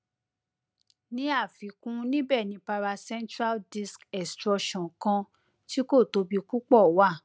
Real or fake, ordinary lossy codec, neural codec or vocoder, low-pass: real; none; none; none